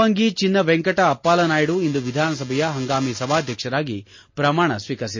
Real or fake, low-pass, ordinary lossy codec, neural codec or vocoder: real; 7.2 kHz; MP3, 32 kbps; none